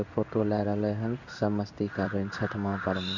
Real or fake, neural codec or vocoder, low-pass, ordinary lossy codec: fake; codec, 16 kHz in and 24 kHz out, 1 kbps, XY-Tokenizer; 7.2 kHz; none